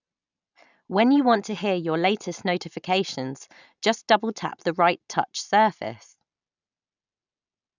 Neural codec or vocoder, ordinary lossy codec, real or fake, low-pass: none; none; real; 7.2 kHz